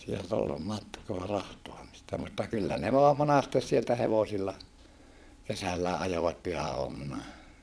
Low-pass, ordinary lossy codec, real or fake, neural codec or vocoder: none; none; fake; vocoder, 22.05 kHz, 80 mel bands, Vocos